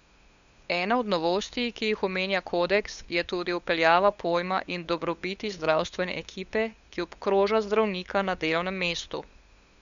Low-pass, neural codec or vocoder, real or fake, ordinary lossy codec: 7.2 kHz; codec, 16 kHz, 8 kbps, FunCodec, trained on LibriTTS, 25 frames a second; fake; Opus, 64 kbps